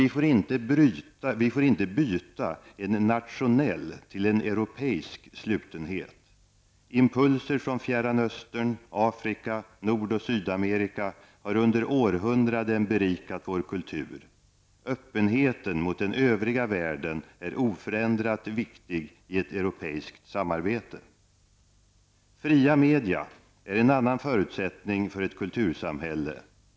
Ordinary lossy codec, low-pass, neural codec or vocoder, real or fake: none; none; none; real